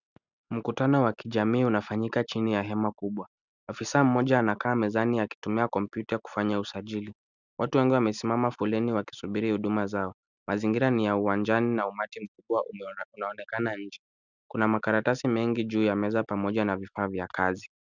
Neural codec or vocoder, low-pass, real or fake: none; 7.2 kHz; real